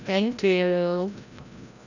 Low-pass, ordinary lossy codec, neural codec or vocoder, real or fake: 7.2 kHz; none; codec, 16 kHz, 0.5 kbps, FreqCodec, larger model; fake